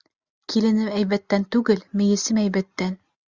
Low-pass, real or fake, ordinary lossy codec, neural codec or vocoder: 7.2 kHz; real; Opus, 64 kbps; none